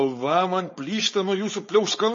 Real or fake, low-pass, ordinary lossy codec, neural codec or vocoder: fake; 7.2 kHz; MP3, 32 kbps; codec, 16 kHz, 4.8 kbps, FACodec